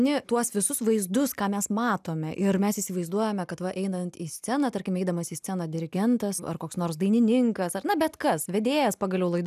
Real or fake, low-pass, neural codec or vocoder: real; 14.4 kHz; none